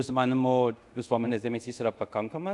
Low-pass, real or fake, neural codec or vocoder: 10.8 kHz; fake; codec, 24 kHz, 0.5 kbps, DualCodec